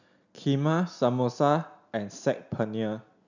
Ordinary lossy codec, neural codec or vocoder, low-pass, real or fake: none; none; 7.2 kHz; real